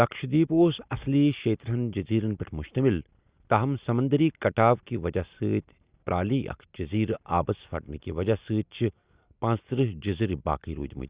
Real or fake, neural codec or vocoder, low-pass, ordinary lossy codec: real; none; 3.6 kHz; Opus, 64 kbps